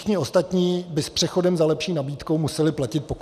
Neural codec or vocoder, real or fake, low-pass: none; real; 14.4 kHz